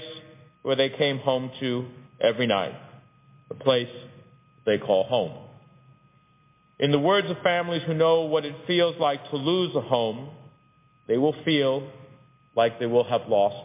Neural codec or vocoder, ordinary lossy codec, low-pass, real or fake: none; MP3, 24 kbps; 3.6 kHz; real